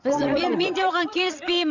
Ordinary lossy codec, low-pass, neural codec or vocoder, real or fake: none; 7.2 kHz; vocoder, 22.05 kHz, 80 mel bands, Vocos; fake